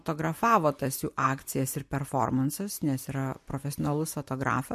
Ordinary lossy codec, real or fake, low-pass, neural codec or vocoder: MP3, 64 kbps; real; 14.4 kHz; none